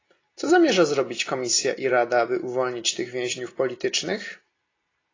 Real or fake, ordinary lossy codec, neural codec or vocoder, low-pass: real; AAC, 32 kbps; none; 7.2 kHz